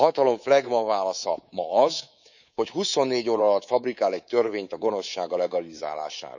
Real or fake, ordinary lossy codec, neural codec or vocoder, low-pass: fake; none; codec, 24 kHz, 3.1 kbps, DualCodec; 7.2 kHz